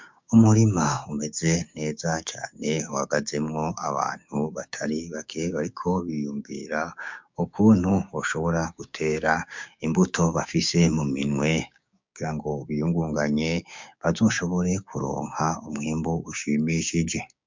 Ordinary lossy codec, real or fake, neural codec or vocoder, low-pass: MP3, 64 kbps; fake; codec, 16 kHz, 6 kbps, DAC; 7.2 kHz